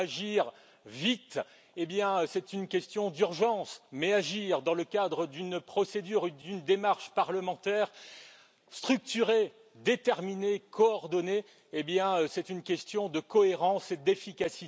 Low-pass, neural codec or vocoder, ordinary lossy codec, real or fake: none; none; none; real